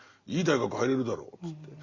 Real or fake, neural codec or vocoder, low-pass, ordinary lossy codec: real; none; 7.2 kHz; Opus, 64 kbps